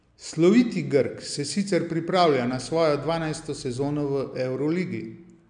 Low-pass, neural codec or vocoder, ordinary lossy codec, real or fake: 9.9 kHz; none; MP3, 96 kbps; real